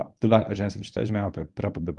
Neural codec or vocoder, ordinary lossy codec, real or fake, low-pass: codec, 24 kHz, 0.9 kbps, WavTokenizer, medium speech release version 1; Opus, 32 kbps; fake; 10.8 kHz